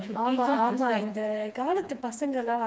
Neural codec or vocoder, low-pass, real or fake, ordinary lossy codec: codec, 16 kHz, 2 kbps, FreqCodec, smaller model; none; fake; none